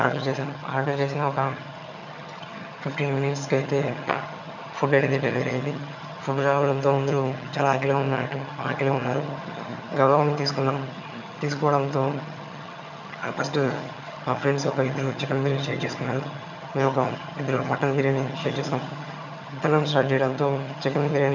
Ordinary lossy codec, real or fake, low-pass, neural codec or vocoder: none; fake; 7.2 kHz; vocoder, 22.05 kHz, 80 mel bands, HiFi-GAN